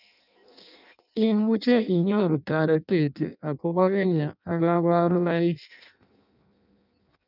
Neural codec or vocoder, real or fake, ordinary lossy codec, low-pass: codec, 16 kHz in and 24 kHz out, 0.6 kbps, FireRedTTS-2 codec; fake; none; 5.4 kHz